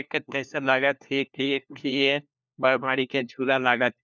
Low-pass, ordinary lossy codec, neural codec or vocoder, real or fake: none; none; codec, 16 kHz, 1 kbps, FunCodec, trained on LibriTTS, 50 frames a second; fake